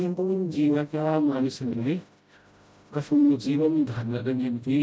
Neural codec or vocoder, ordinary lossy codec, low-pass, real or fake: codec, 16 kHz, 0.5 kbps, FreqCodec, smaller model; none; none; fake